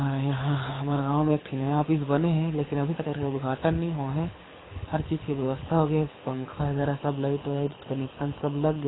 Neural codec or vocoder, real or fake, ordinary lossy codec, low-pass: codec, 24 kHz, 6 kbps, HILCodec; fake; AAC, 16 kbps; 7.2 kHz